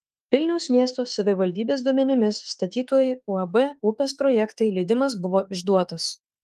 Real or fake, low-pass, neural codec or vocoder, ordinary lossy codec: fake; 14.4 kHz; autoencoder, 48 kHz, 32 numbers a frame, DAC-VAE, trained on Japanese speech; Opus, 24 kbps